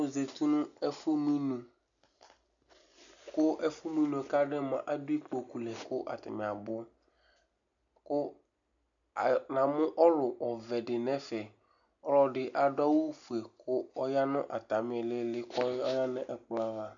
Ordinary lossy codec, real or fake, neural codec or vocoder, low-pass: MP3, 96 kbps; real; none; 7.2 kHz